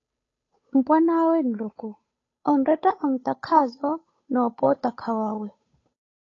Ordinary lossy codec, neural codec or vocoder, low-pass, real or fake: AAC, 32 kbps; codec, 16 kHz, 8 kbps, FunCodec, trained on Chinese and English, 25 frames a second; 7.2 kHz; fake